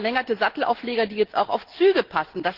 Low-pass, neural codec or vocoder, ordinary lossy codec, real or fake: 5.4 kHz; none; Opus, 16 kbps; real